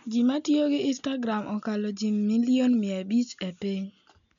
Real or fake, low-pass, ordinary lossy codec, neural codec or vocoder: real; 7.2 kHz; none; none